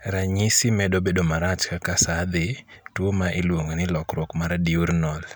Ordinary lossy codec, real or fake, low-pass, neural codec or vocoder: none; real; none; none